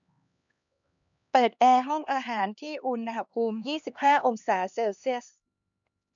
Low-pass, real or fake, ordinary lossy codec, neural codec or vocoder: 7.2 kHz; fake; none; codec, 16 kHz, 2 kbps, X-Codec, HuBERT features, trained on LibriSpeech